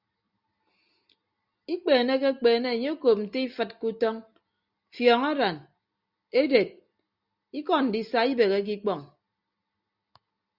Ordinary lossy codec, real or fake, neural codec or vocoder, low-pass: Opus, 64 kbps; real; none; 5.4 kHz